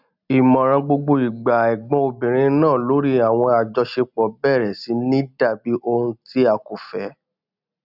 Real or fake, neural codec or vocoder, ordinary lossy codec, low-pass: real; none; none; 5.4 kHz